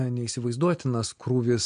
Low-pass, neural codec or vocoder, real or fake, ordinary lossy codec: 9.9 kHz; none; real; MP3, 64 kbps